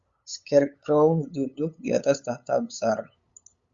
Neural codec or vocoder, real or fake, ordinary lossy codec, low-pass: codec, 16 kHz, 8 kbps, FunCodec, trained on LibriTTS, 25 frames a second; fake; Opus, 64 kbps; 7.2 kHz